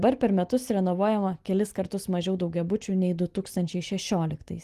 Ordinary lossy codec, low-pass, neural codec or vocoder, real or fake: Opus, 24 kbps; 14.4 kHz; none; real